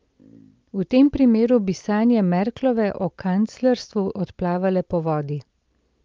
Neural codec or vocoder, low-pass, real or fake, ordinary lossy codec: none; 7.2 kHz; real; Opus, 32 kbps